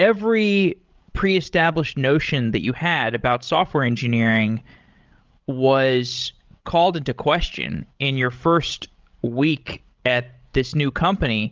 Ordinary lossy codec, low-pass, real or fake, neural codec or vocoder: Opus, 32 kbps; 7.2 kHz; fake; codec, 16 kHz, 16 kbps, FreqCodec, larger model